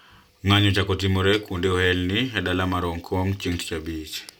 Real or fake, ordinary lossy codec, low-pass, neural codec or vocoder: real; none; 19.8 kHz; none